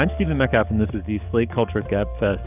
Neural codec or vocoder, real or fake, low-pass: codec, 44.1 kHz, 7.8 kbps, DAC; fake; 3.6 kHz